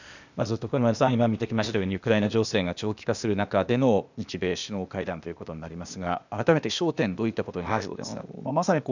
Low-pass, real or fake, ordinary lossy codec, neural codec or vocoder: 7.2 kHz; fake; none; codec, 16 kHz, 0.8 kbps, ZipCodec